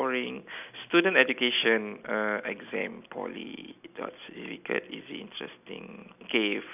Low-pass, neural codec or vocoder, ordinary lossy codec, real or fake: 3.6 kHz; none; none; real